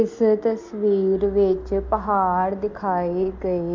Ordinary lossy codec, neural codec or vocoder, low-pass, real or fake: MP3, 48 kbps; none; 7.2 kHz; real